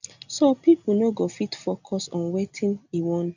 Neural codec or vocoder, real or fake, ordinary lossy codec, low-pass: none; real; none; 7.2 kHz